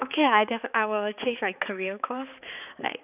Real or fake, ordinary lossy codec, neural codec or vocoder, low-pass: fake; none; codec, 16 kHz, 4 kbps, X-Codec, HuBERT features, trained on balanced general audio; 3.6 kHz